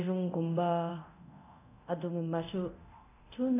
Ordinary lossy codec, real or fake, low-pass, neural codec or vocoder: none; fake; 3.6 kHz; codec, 24 kHz, 0.9 kbps, DualCodec